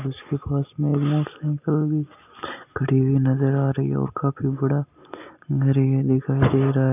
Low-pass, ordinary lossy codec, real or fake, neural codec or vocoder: 3.6 kHz; none; real; none